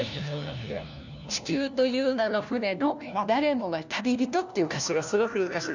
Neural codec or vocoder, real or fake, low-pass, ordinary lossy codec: codec, 16 kHz, 1 kbps, FunCodec, trained on LibriTTS, 50 frames a second; fake; 7.2 kHz; none